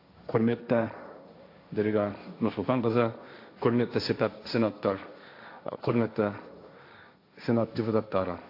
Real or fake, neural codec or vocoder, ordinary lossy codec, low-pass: fake; codec, 16 kHz, 1.1 kbps, Voila-Tokenizer; AAC, 32 kbps; 5.4 kHz